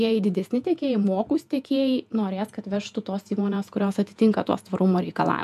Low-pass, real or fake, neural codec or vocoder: 14.4 kHz; fake; vocoder, 48 kHz, 128 mel bands, Vocos